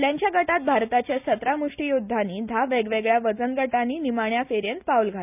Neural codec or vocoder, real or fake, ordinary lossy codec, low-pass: none; real; none; 3.6 kHz